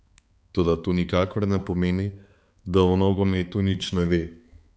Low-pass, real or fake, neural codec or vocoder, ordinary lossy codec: none; fake; codec, 16 kHz, 4 kbps, X-Codec, HuBERT features, trained on balanced general audio; none